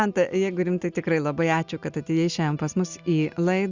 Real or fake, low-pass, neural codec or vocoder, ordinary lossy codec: real; 7.2 kHz; none; Opus, 64 kbps